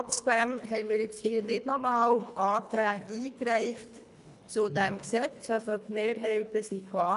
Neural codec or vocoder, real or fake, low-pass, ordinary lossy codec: codec, 24 kHz, 1.5 kbps, HILCodec; fake; 10.8 kHz; none